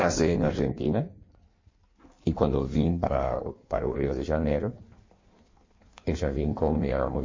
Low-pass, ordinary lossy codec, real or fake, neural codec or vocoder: 7.2 kHz; MP3, 32 kbps; fake; codec, 16 kHz in and 24 kHz out, 1.1 kbps, FireRedTTS-2 codec